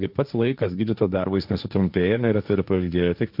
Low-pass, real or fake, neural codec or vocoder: 5.4 kHz; fake; codec, 16 kHz, 1.1 kbps, Voila-Tokenizer